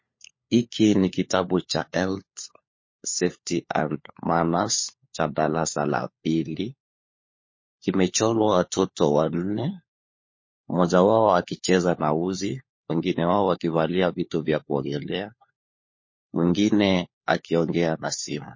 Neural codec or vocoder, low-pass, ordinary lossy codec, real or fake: codec, 16 kHz, 4 kbps, FunCodec, trained on LibriTTS, 50 frames a second; 7.2 kHz; MP3, 32 kbps; fake